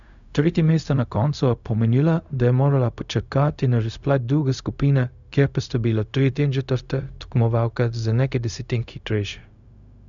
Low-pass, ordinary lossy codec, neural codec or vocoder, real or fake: 7.2 kHz; none; codec, 16 kHz, 0.4 kbps, LongCat-Audio-Codec; fake